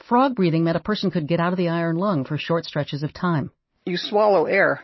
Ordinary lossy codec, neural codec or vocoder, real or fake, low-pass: MP3, 24 kbps; none; real; 7.2 kHz